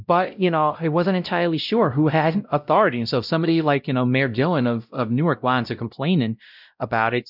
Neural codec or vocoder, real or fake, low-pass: codec, 16 kHz, 0.5 kbps, X-Codec, WavLM features, trained on Multilingual LibriSpeech; fake; 5.4 kHz